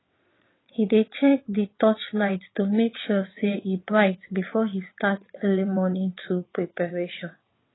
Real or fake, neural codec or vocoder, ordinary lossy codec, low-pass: fake; vocoder, 22.05 kHz, 80 mel bands, WaveNeXt; AAC, 16 kbps; 7.2 kHz